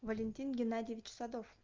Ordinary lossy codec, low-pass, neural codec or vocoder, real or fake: Opus, 24 kbps; 7.2 kHz; none; real